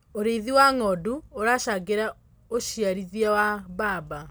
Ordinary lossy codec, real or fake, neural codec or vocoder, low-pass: none; real; none; none